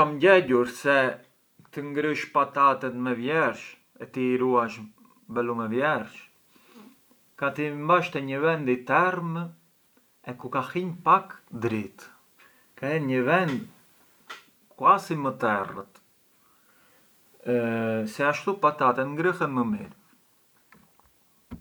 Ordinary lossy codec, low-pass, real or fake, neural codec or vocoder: none; none; real; none